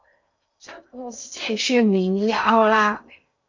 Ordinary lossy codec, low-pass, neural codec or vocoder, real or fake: MP3, 48 kbps; 7.2 kHz; codec, 16 kHz in and 24 kHz out, 0.6 kbps, FocalCodec, streaming, 2048 codes; fake